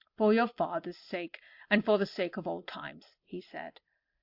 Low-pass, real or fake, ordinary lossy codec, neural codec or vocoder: 5.4 kHz; real; AAC, 48 kbps; none